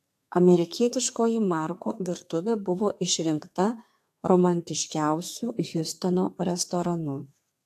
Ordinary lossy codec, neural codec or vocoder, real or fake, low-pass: AAC, 64 kbps; codec, 32 kHz, 1.9 kbps, SNAC; fake; 14.4 kHz